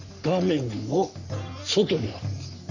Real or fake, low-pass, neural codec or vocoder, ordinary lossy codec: fake; 7.2 kHz; codec, 44.1 kHz, 3.4 kbps, Pupu-Codec; none